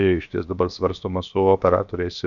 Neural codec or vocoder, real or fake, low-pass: codec, 16 kHz, 0.7 kbps, FocalCodec; fake; 7.2 kHz